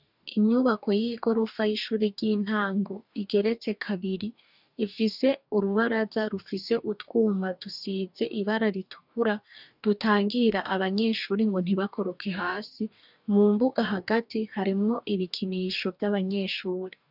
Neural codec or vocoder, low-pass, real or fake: codec, 44.1 kHz, 2.6 kbps, DAC; 5.4 kHz; fake